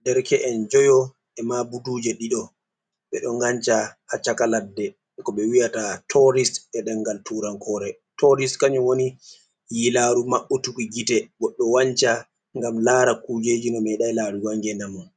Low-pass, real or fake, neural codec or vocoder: 9.9 kHz; real; none